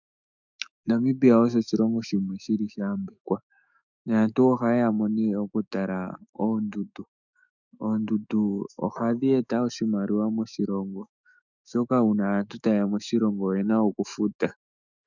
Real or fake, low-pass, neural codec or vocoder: fake; 7.2 kHz; autoencoder, 48 kHz, 128 numbers a frame, DAC-VAE, trained on Japanese speech